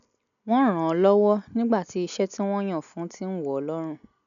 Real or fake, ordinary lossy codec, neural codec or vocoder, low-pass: real; none; none; 7.2 kHz